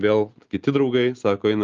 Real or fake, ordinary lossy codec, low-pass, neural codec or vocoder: real; Opus, 24 kbps; 7.2 kHz; none